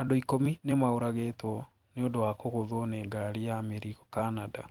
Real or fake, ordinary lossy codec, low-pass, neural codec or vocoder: fake; Opus, 24 kbps; 19.8 kHz; vocoder, 44.1 kHz, 128 mel bands every 256 samples, BigVGAN v2